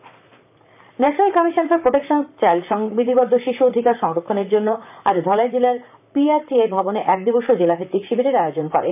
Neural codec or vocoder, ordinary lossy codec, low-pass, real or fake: vocoder, 44.1 kHz, 128 mel bands, Pupu-Vocoder; none; 3.6 kHz; fake